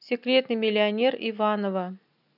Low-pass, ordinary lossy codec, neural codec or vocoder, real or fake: 5.4 kHz; none; none; real